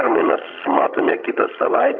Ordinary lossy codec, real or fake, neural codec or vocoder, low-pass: MP3, 48 kbps; fake; vocoder, 22.05 kHz, 80 mel bands, HiFi-GAN; 7.2 kHz